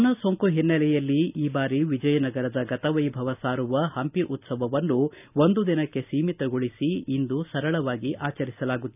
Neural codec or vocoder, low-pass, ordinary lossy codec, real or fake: none; 3.6 kHz; none; real